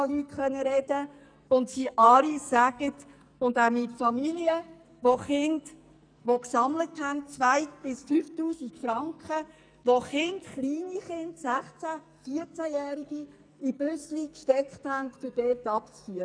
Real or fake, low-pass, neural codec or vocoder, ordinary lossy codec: fake; 9.9 kHz; codec, 44.1 kHz, 2.6 kbps, SNAC; none